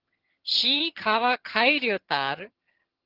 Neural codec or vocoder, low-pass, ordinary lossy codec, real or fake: vocoder, 22.05 kHz, 80 mel bands, HiFi-GAN; 5.4 kHz; Opus, 16 kbps; fake